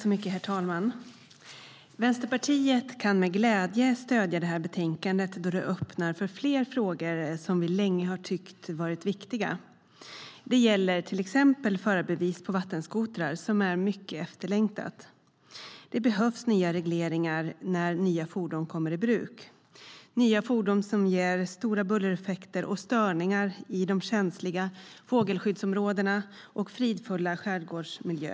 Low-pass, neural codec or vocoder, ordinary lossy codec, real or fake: none; none; none; real